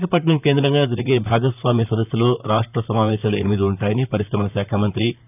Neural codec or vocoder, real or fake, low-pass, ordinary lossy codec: vocoder, 44.1 kHz, 128 mel bands, Pupu-Vocoder; fake; 3.6 kHz; none